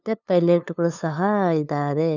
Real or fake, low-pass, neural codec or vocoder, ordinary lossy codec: fake; 7.2 kHz; codec, 16 kHz, 2 kbps, FunCodec, trained on LibriTTS, 25 frames a second; none